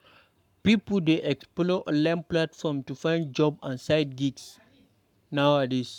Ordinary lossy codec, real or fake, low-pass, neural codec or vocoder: none; fake; 19.8 kHz; codec, 44.1 kHz, 7.8 kbps, Pupu-Codec